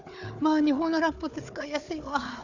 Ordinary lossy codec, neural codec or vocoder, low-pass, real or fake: Opus, 64 kbps; codec, 16 kHz, 4 kbps, FunCodec, trained on Chinese and English, 50 frames a second; 7.2 kHz; fake